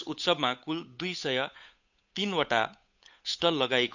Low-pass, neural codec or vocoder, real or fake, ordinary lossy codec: 7.2 kHz; codec, 16 kHz, 8 kbps, FunCodec, trained on Chinese and English, 25 frames a second; fake; none